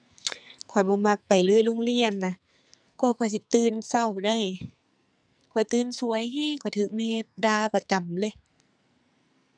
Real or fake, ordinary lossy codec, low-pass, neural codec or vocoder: fake; none; 9.9 kHz; codec, 44.1 kHz, 2.6 kbps, SNAC